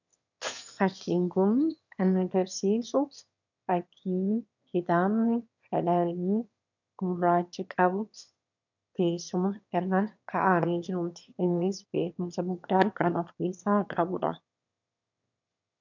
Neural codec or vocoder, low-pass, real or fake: autoencoder, 22.05 kHz, a latent of 192 numbers a frame, VITS, trained on one speaker; 7.2 kHz; fake